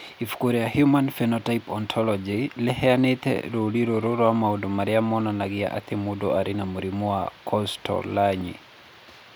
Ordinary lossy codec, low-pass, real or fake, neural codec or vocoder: none; none; real; none